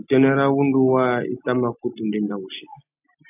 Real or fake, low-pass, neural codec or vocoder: real; 3.6 kHz; none